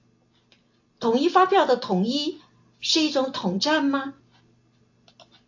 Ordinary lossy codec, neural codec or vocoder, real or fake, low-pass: AAC, 48 kbps; none; real; 7.2 kHz